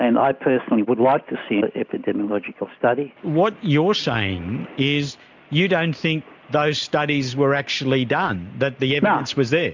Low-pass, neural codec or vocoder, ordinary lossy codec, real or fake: 7.2 kHz; none; MP3, 64 kbps; real